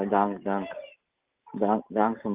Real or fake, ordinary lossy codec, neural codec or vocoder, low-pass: real; Opus, 32 kbps; none; 3.6 kHz